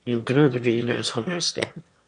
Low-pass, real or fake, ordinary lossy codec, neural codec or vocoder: 9.9 kHz; fake; MP3, 64 kbps; autoencoder, 22.05 kHz, a latent of 192 numbers a frame, VITS, trained on one speaker